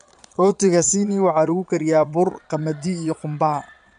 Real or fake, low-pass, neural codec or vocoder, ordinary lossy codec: fake; 9.9 kHz; vocoder, 22.05 kHz, 80 mel bands, Vocos; none